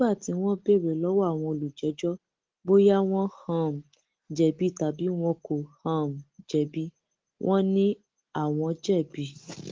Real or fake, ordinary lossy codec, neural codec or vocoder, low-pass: real; Opus, 16 kbps; none; 7.2 kHz